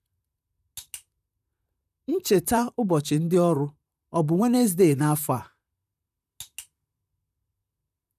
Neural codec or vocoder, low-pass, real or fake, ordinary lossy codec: vocoder, 44.1 kHz, 128 mel bands, Pupu-Vocoder; 14.4 kHz; fake; none